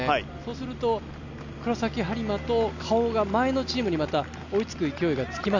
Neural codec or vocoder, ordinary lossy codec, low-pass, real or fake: none; none; 7.2 kHz; real